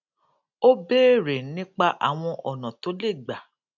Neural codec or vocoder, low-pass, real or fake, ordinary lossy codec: none; 7.2 kHz; real; none